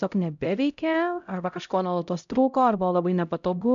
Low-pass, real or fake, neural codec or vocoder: 7.2 kHz; fake; codec, 16 kHz, 0.5 kbps, X-Codec, HuBERT features, trained on LibriSpeech